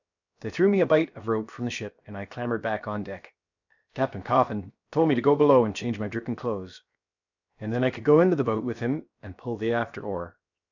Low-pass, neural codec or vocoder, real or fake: 7.2 kHz; codec, 16 kHz, 0.7 kbps, FocalCodec; fake